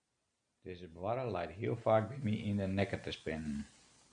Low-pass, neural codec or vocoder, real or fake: 9.9 kHz; none; real